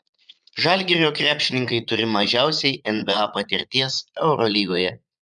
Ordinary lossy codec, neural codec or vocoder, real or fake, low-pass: MP3, 96 kbps; vocoder, 22.05 kHz, 80 mel bands, Vocos; fake; 9.9 kHz